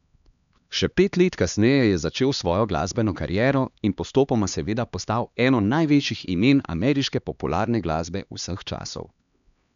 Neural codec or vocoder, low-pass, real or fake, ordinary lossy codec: codec, 16 kHz, 2 kbps, X-Codec, HuBERT features, trained on LibriSpeech; 7.2 kHz; fake; none